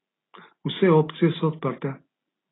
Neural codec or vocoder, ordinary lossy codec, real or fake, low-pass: none; AAC, 16 kbps; real; 7.2 kHz